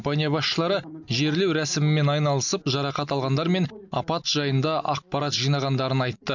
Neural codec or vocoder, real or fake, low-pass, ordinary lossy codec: none; real; 7.2 kHz; none